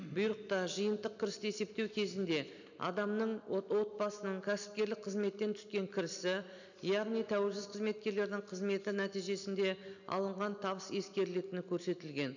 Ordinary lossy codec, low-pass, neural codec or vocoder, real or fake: none; 7.2 kHz; none; real